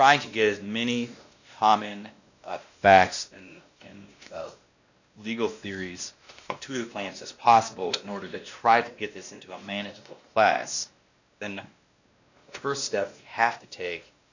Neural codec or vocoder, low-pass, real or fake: codec, 16 kHz, 1 kbps, X-Codec, WavLM features, trained on Multilingual LibriSpeech; 7.2 kHz; fake